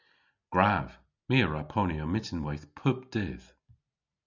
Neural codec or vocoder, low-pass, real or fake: none; 7.2 kHz; real